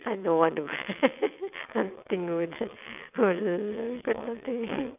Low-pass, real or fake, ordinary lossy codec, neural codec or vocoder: 3.6 kHz; fake; none; vocoder, 22.05 kHz, 80 mel bands, WaveNeXt